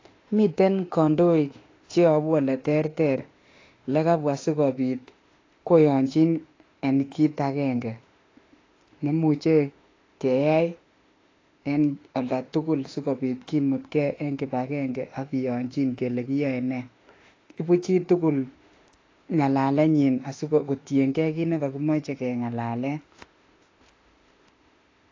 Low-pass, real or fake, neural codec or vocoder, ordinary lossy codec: 7.2 kHz; fake; autoencoder, 48 kHz, 32 numbers a frame, DAC-VAE, trained on Japanese speech; AAC, 32 kbps